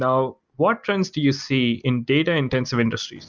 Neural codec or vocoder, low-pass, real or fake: none; 7.2 kHz; real